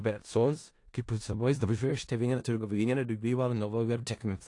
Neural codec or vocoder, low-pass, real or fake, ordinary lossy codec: codec, 16 kHz in and 24 kHz out, 0.4 kbps, LongCat-Audio-Codec, four codebook decoder; 10.8 kHz; fake; AAC, 48 kbps